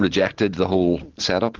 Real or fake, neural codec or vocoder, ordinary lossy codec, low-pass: real; none; Opus, 16 kbps; 7.2 kHz